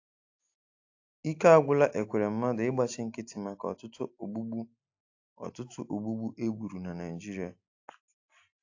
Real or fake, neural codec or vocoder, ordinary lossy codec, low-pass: real; none; AAC, 48 kbps; 7.2 kHz